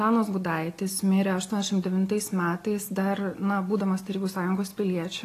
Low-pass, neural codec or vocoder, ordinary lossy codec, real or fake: 14.4 kHz; none; AAC, 48 kbps; real